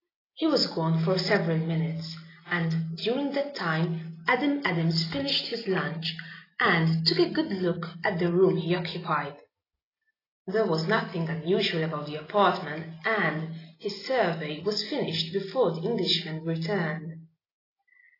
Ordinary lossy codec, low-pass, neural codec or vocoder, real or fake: AAC, 24 kbps; 5.4 kHz; none; real